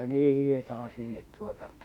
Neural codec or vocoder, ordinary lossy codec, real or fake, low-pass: autoencoder, 48 kHz, 32 numbers a frame, DAC-VAE, trained on Japanese speech; Opus, 64 kbps; fake; 19.8 kHz